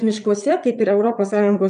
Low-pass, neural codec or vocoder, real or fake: 9.9 kHz; vocoder, 22.05 kHz, 80 mel bands, Vocos; fake